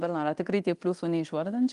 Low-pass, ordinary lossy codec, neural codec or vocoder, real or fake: 10.8 kHz; Opus, 32 kbps; codec, 24 kHz, 0.9 kbps, DualCodec; fake